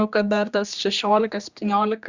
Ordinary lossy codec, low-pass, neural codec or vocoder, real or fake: Opus, 64 kbps; 7.2 kHz; codec, 16 kHz, 2 kbps, X-Codec, HuBERT features, trained on general audio; fake